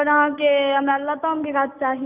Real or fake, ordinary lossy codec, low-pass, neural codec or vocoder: real; none; 3.6 kHz; none